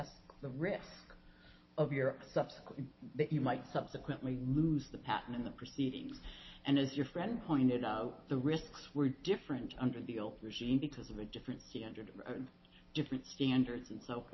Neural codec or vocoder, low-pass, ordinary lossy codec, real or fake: none; 7.2 kHz; MP3, 24 kbps; real